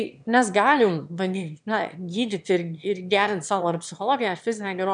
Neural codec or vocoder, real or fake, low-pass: autoencoder, 22.05 kHz, a latent of 192 numbers a frame, VITS, trained on one speaker; fake; 9.9 kHz